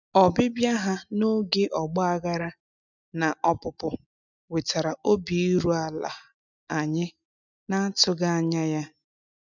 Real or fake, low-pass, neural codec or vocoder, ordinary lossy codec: real; 7.2 kHz; none; none